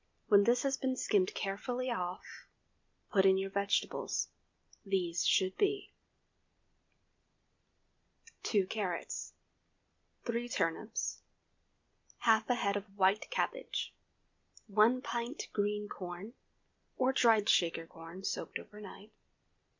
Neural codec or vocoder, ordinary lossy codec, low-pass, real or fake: none; MP3, 64 kbps; 7.2 kHz; real